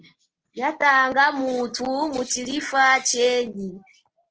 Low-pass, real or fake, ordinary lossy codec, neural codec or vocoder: 7.2 kHz; real; Opus, 16 kbps; none